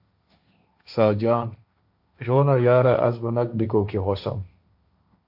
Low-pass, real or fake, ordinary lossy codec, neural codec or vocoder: 5.4 kHz; fake; AAC, 48 kbps; codec, 16 kHz, 1.1 kbps, Voila-Tokenizer